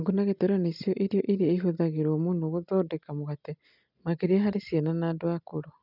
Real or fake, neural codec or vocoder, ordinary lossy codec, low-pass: real; none; none; 5.4 kHz